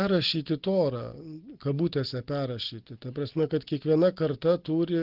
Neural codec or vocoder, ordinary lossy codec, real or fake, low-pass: none; Opus, 16 kbps; real; 5.4 kHz